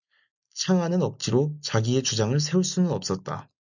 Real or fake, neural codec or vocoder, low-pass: real; none; 7.2 kHz